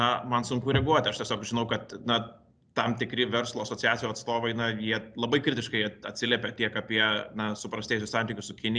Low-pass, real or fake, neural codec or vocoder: 9.9 kHz; real; none